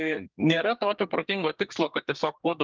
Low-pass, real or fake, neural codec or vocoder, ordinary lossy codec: 7.2 kHz; fake; codec, 32 kHz, 1.9 kbps, SNAC; Opus, 24 kbps